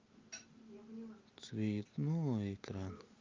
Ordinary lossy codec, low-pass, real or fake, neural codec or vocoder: Opus, 32 kbps; 7.2 kHz; real; none